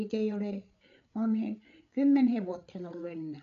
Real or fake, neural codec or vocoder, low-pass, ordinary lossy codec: fake; codec, 16 kHz, 8 kbps, FreqCodec, larger model; 7.2 kHz; AAC, 96 kbps